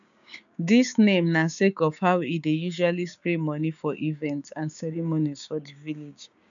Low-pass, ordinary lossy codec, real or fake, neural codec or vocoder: 7.2 kHz; none; fake; codec, 16 kHz, 6 kbps, DAC